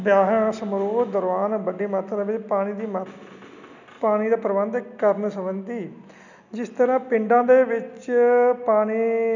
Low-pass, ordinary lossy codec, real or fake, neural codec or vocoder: 7.2 kHz; none; real; none